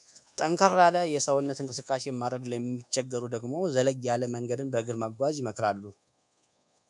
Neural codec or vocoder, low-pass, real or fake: codec, 24 kHz, 1.2 kbps, DualCodec; 10.8 kHz; fake